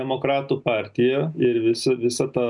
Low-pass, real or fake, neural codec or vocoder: 10.8 kHz; real; none